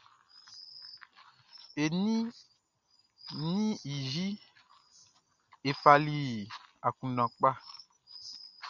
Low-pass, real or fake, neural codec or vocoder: 7.2 kHz; real; none